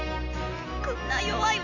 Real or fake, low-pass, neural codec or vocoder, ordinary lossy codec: real; 7.2 kHz; none; none